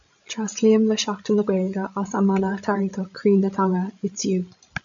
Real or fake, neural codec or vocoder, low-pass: fake; codec, 16 kHz, 16 kbps, FreqCodec, larger model; 7.2 kHz